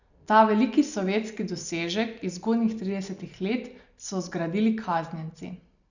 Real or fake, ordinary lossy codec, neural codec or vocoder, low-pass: real; none; none; 7.2 kHz